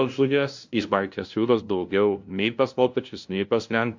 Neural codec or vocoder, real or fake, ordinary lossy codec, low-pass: codec, 16 kHz, 0.5 kbps, FunCodec, trained on LibriTTS, 25 frames a second; fake; MP3, 48 kbps; 7.2 kHz